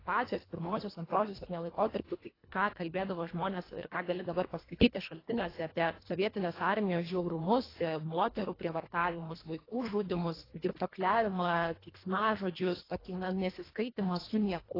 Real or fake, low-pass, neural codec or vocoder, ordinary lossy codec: fake; 5.4 kHz; codec, 24 kHz, 1.5 kbps, HILCodec; AAC, 24 kbps